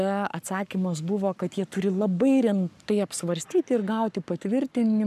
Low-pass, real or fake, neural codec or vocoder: 14.4 kHz; fake; codec, 44.1 kHz, 7.8 kbps, Pupu-Codec